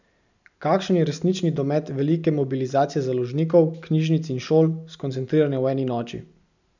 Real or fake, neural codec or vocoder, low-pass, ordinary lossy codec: real; none; 7.2 kHz; none